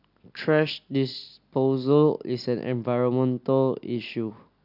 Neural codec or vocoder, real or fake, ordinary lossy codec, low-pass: none; real; none; 5.4 kHz